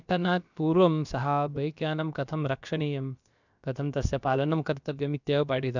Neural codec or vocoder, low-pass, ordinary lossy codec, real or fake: codec, 16 kHz, about 1 kbps, DyCAST, with the encoder's durations; 7.2 kHz; none; fake